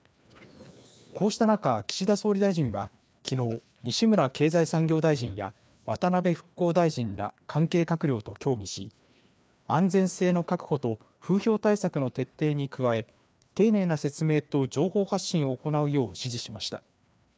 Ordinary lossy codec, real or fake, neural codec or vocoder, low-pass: none; fake; codec, 16 kHz, 2 kbps, FreqCodec, larger model; none